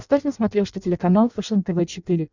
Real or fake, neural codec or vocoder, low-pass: fake; codec, 16 kHz in and 24 kHz out, 0.6 kbps, FireRedTTS-2 codec; 7.2 kHz